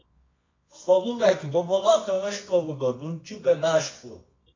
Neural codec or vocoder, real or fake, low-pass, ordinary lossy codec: codec, 24 kHz, 0.9 kbps, WavTokenizer, medium music audio release; fake; 7.2 kHz; AAC, 32 kbps